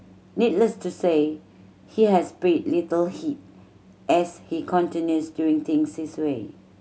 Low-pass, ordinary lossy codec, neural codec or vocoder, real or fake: none; none; none; real